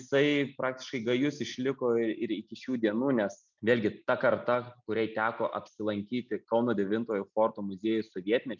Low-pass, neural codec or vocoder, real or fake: 7.2 kHz; none; real